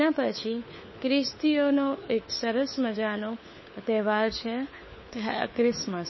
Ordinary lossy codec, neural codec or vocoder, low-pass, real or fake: MP3, 24 kbps; codec, 16 kHz, 4 kbps, FunCodec, trained on LibriTTS, 50 frames a second; 7.2 kHz; fake